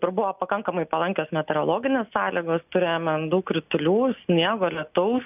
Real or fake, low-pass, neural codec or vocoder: real; 3.6 kHz; none